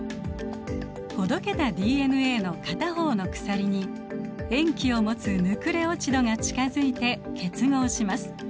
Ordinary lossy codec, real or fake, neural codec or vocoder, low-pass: none; real; none; none